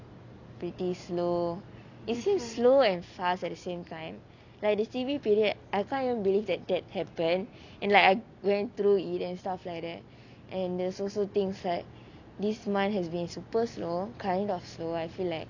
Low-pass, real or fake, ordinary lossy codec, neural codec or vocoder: 7.2 kHz; real; none; none